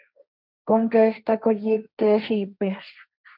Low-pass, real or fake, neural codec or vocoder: 5.4 kHz; fake; codec, 16 kHz, 1.1 kbps, Voila-Tokenizer